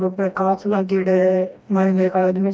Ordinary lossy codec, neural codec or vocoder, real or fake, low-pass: none; codec, 16 kHz, 1 kbps, FreqCodec, smaller model; fake; none